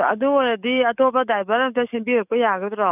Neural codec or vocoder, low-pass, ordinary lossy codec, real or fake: codec, 44.1 kHz, 7.8 kbps, DAC; 3.6 kHz; none; fake